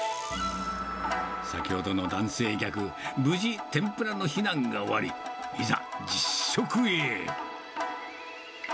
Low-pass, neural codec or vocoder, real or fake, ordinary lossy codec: none; none; real; none